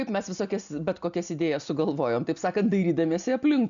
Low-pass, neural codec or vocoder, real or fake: 7.2 kHz; none; real